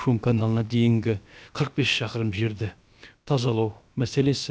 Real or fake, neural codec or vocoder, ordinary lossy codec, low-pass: fake; codec, 16 kHz, about 1 kbps, DyCAST, with the encoder's durations; none; none